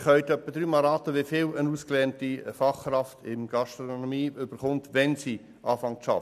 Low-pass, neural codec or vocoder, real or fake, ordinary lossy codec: 14.4 kHz; none; real; none